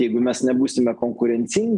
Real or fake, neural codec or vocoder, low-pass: real; none; 10.8 kHz